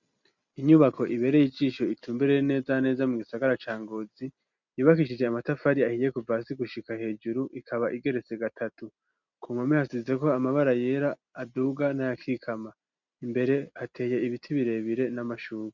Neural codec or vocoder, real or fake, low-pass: none; real; 7.2 kHz